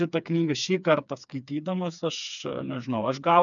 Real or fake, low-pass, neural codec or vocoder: fake; 7.2 kHz; codec, 16 kHz, 4 kbps, FreqCodec, smaller model